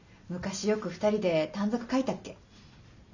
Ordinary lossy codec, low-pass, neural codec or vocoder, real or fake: none; 7.2 kHz; none; real